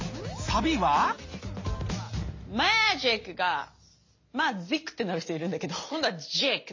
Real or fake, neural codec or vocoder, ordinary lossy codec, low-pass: real; none; MP3, 32 kbps; 7.2 kHz